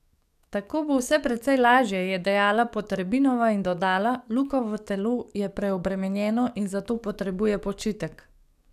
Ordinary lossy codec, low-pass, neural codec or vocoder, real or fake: none; 14.4 kHz; codec, 44.1 kHz, 7.8 kbps, DAC; fake